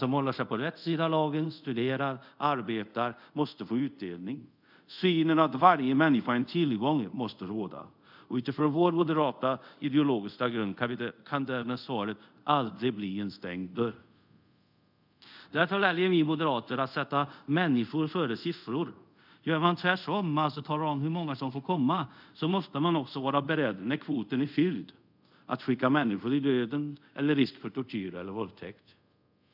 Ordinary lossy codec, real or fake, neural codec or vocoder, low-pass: none; fake; codec, 24 kHz, 0.5 kbps, DualCodec; 5.4 kHz